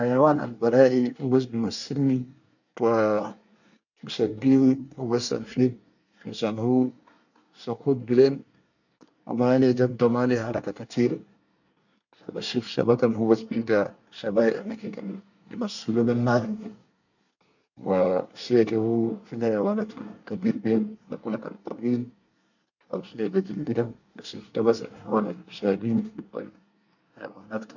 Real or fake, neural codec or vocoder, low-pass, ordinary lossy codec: fake; codec, 24 kHz, 1 kbps, SNAC; 7.2 kHz; none